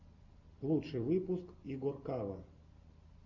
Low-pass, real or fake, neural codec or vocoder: 7.2 kHz; real; none